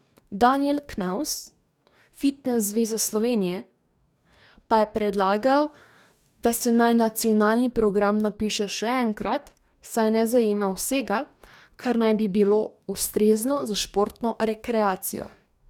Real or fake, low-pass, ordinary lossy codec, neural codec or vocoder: fake; 19.8 kHz; none; codec, 44.1 kHz, 2.6 kbps, DAC